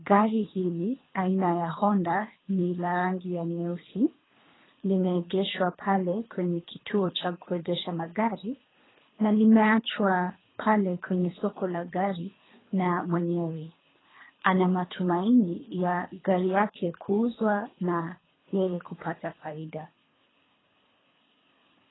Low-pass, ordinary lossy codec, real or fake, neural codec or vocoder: 7.2 kHz; AAC, 16 kbps; fake; codec, 24 kHz, 3 kbps, HILCodec